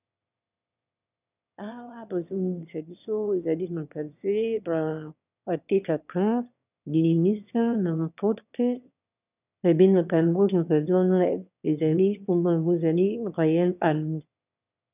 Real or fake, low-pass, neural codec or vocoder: fake; 3.6 kHz; autoencoder, 22.05 kHz, a latent of 192 numbers a frame, VITS, trained on one speaker